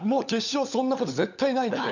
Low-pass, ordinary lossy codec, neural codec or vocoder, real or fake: 7.2 kHz; none; codec, 16 kHz, 16 kbps, FunCodec, trained on LibriTTS, 50 frames a second; fake